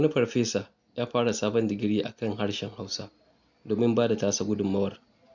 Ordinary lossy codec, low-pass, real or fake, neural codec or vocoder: none; 7.2 kHz; real; none